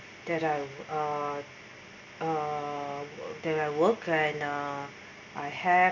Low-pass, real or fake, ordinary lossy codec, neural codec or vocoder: 7.2 kHz; real; none; none